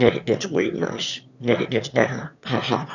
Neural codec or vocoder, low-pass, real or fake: autoencoder, 22.05 kHz, a latent of 192 numbers a frame, VITS, trained on one speaker; 7.2 kHz; fake